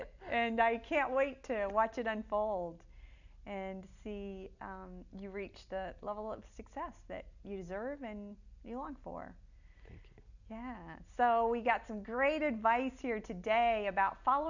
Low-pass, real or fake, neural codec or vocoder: 7.2 kHz; real; none